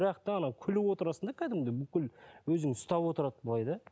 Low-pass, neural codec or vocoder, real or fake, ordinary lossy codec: none; none; real; none